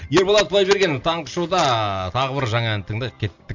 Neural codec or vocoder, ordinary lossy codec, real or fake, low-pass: none; none; real; 7.2 kHz